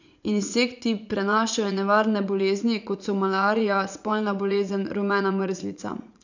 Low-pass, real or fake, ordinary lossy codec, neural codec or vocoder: 7.2 kHz; fake; none; vocoder, 44.1 kHz, 80 mel bands, Vocos